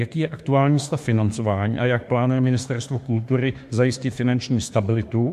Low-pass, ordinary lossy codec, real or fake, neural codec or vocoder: 14.4 kHz; MP3, 64 kbps; fake; autoencoder, 48 kHz, 32 numbers a frame, DAC-VAE, trained on Japanese speech